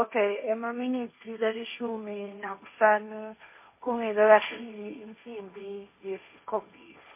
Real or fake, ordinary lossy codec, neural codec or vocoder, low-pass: fake; MP3, 24 kbps; codec, 16 kHz, 1.1 kbps, Voila-Tokenizer; 3.6 kHz